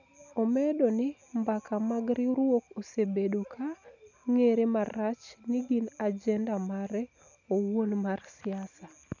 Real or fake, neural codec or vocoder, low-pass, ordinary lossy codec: real; none; 7.2 kHz; none